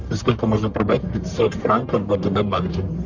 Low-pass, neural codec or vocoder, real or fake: 7.2 kHz; codec, 44.1 kHz, 1.7 kbps, Pupu-Codec; fake